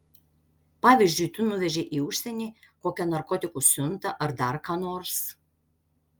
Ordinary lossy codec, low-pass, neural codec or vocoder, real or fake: Opus, 32 kbps; 19.8 kHz; none; real